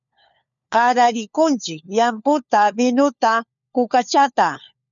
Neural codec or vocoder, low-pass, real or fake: codec, 16 kHz, 4 kbps, FunCodec, trained on LibriTTS, 50 frames a second; 7.2 kHz; fake